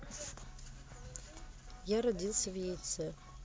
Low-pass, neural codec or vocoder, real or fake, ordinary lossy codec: none; none; real; none